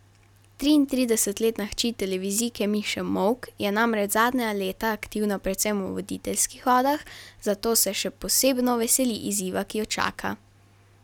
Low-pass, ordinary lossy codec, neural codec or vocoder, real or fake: 19.8 kHz; none; none; real